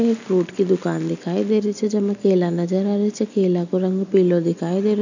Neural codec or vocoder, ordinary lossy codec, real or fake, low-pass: none; none; real; 7.2 kHz